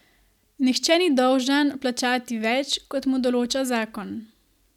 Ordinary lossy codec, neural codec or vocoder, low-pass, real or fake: none; none; 19.8 kHz; real